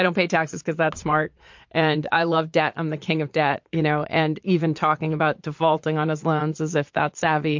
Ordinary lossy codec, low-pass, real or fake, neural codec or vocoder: MP3, 48 kbps; 7.2 kHz; fake; vocoder, 22.05 kHz, 80 mel bands, Vocos